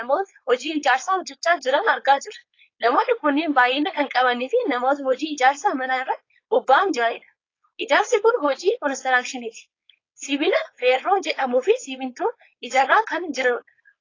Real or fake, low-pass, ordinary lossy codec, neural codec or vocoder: fake; 7.2 kHz; AAC, 32 kbps; codec, 16 kHz, 4.8 kbps, FACodec